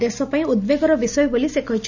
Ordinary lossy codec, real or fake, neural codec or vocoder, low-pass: none; real; none; 7.2 kHz